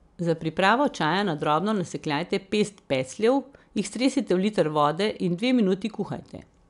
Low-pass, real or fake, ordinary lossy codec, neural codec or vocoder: 10.8 kHz; real; none; none